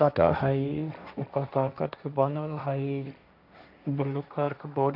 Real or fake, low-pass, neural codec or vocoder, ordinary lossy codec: fake; 5.4 kHz; codec, 16 kHz, 1.1 kbps, Voila-Tokenizer; none